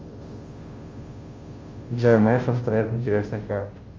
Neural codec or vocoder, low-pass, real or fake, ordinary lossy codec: codec, 16 kHz, 0.5 kbps, FunCodec, trained on Chinese and English, 25 frames a second; 7.2 kHz; fake; Opus, 32 kbps